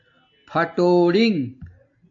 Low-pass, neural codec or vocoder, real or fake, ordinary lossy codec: 7.2 kHz; none; real; AAC, 64 kbps